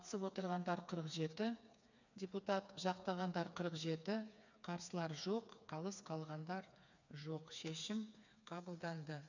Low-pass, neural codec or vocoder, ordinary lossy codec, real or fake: 7.2 kHz; codec, 16 kHz, 4 kbps, FreqCodec, smaller model; none; fake